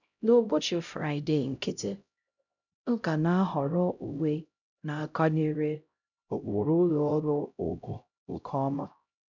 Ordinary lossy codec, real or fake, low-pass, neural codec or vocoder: none; fake; 7.2 kHz; codec, 16 kHz, 0.5 kbps, X-Codec, HuBERT features, trained on LibriSpeech